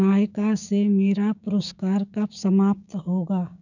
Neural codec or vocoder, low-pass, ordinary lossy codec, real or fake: codec, 16 kHz, 6 kbps, DAC; 7.2 kHz; none; fake